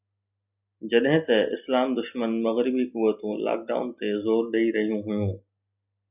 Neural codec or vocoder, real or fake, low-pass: none; real; 3.6 kHz